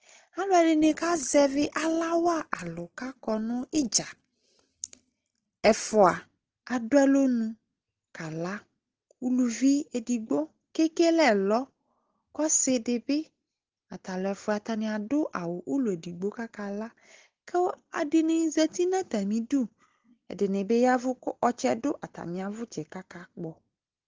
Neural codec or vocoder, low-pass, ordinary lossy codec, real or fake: none; 7.2 kHz; Opus, 16 kbps; real